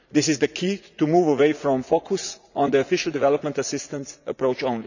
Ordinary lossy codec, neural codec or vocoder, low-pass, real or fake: none; vocoder, 44.1 kHz, 80 mel bands, Vocos; 7.2 kHz; fake